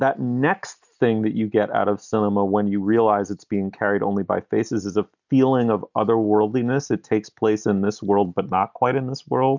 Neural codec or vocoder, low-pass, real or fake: none; 7.2 kHz; real